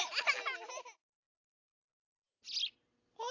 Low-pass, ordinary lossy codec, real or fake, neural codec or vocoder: 7.2 kHz; AAC, 48 kbps; real; none